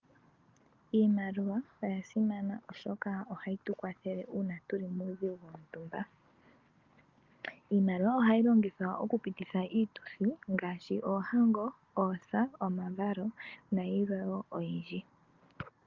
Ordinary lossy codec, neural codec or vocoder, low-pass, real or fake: Opus, 24 kbps; none; 7.2 kHz; real